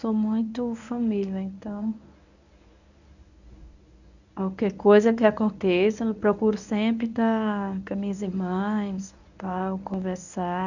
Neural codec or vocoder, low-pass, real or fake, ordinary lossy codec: codec, 24 kHz, 0.9 kbps, WavTokenizer, medium speech release version 1; 7.2 kHz; fake; none